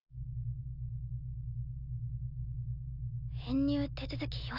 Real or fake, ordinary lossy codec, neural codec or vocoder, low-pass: real; none; none; 5.4 kHz